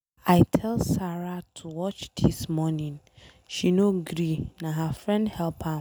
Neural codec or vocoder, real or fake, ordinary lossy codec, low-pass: none; real; none; none